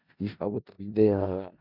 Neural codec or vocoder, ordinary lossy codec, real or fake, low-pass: codec, 16 kHz in and 24 kHz out, 0.4 kbps, LongCat-Audio-Codec, four codebook decoder; none; fake; 5.4 kHz